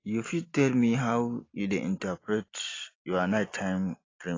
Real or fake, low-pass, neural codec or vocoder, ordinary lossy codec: real; 7.2 kHz; none; AAC, 32 kbps